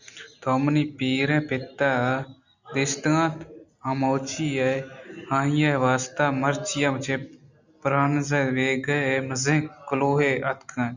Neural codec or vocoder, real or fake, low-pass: none; real; 7.2 kHz